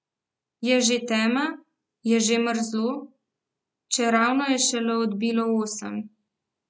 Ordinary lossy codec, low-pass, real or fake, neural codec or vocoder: none; none; real; none